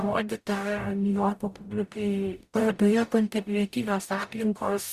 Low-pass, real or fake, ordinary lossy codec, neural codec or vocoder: 14.4 kHz; fake; Opus, 64 kbps; codec, 44.1 kHz, 0.9 kbps, DAC